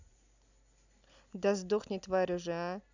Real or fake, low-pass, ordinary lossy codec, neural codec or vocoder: real; 7.2 kHz; none; none